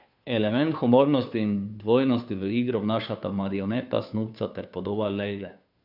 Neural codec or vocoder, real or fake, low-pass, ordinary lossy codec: codec, 16 kHz, 2 kbps, FunCodec, trained on Chinese and English, 25 frames a second; fake; 5.4 kHz; none